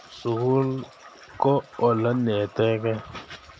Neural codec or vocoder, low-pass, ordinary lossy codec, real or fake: none; none; none; real